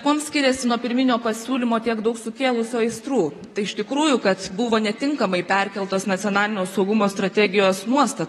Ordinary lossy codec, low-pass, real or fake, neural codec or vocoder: AAC, 32 kbps; 19.8 kHz; fake; vocoder, 44.1 kHz, 128 mel bands, Pupu-Vocoder